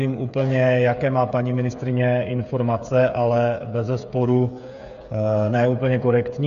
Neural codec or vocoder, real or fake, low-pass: codec, 16 kHz, 8 kbps, FreqCodec, smaller model; fake; 7.2 kHz